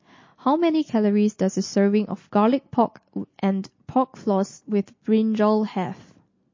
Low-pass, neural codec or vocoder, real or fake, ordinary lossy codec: 7.2 kHz; none; real; MP3, 32 kbps